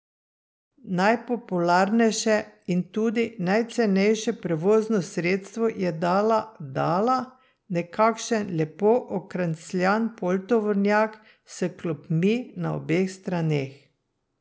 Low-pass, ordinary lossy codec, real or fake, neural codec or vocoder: none; none; real; none